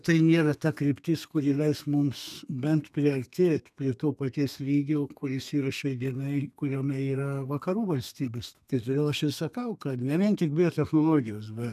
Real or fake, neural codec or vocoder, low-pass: fake; codec, 32 kHz, 1.9 kbps, SNAC; 14.4 kHz